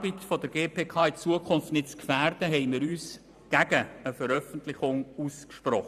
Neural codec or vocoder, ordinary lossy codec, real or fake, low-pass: vocoder, 44.1 kHz, 128 mel bands every 512 samples, BigVGAN v2; none; fake; 14.4 kHz